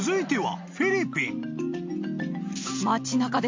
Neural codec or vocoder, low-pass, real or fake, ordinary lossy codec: none; 7.2 kHz; real; MP3, 48 kbps